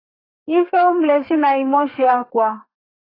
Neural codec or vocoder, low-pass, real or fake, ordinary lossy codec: codec, 32 kHz, 1.9 kbps, SNAC; 5.4 kHz; fake; AAC, 24 kbps